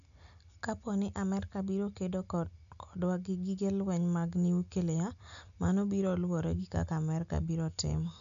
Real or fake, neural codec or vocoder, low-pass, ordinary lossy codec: real; none; 7.2 kHz; none